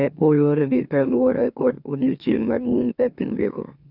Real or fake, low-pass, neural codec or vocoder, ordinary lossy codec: fake; 5.4 kHz; autoencoder, 44.1 kHz, a latent of 192 numbers a frame, MeloTTS; none